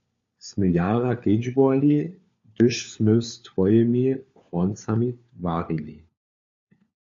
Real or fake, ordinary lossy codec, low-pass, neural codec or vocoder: fake; MP3, 48 kbps; 7.2 kHz; codec, 16 kHz, 4 kbps, FunCodec, trained on LibriTTS, 50 frames a second